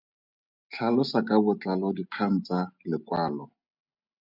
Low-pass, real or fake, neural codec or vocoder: 5.4 kHz; real; none